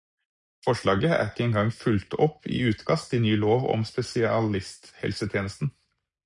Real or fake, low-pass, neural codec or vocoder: real; 10.8 kHz; none